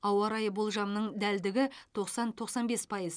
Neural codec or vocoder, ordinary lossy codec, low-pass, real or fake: none; none; 9.9 kHz; real